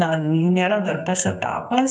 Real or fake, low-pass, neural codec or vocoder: fake; 9.9 kHz; codec, 24 kHz, 0.9 kbps, WavTokenizer, medium music audio release